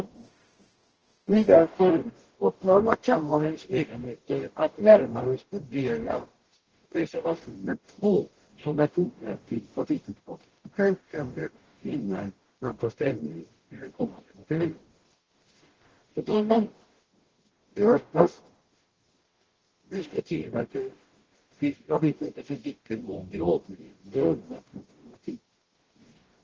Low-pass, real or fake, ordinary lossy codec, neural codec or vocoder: 7.2 kHz; fake; Opus, 16 kbps; codec, 44.1 kHz, 0.9 kbps, DAC